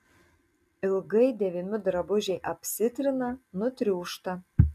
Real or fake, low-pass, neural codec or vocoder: real; 14.4 kHz; none